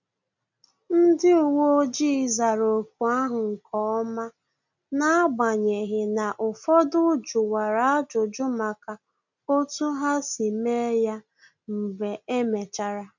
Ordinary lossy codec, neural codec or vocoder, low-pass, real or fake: none; none; 7.2 kHz; real